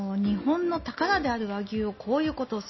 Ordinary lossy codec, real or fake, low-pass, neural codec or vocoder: MP3, 24 kbps; real; 7.2 kHz; none